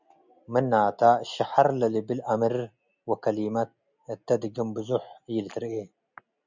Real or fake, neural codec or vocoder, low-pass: real; none; 7.2 kHz